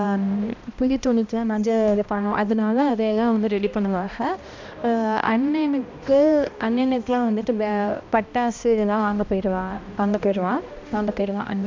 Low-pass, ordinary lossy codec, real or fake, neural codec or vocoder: 7.2 kHz; MP3, 64 kbps; fake; codec, 16 kHz, 1 kbps, X-Codec, HuBERT features, trained on balanced general audio